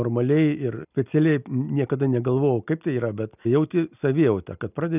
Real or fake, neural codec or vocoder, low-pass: real; none; 3.6 kHz